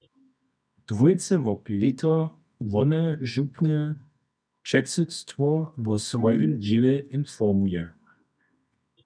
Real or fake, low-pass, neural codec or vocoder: fake; 9.9 kHz; codec, 24 kHz, 0.9 kbps, WavTokenizer, medium music audio release